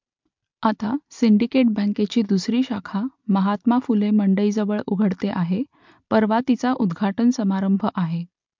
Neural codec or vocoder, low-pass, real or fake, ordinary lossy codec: none; 7.2 kHz; real; MP3, 64 kbps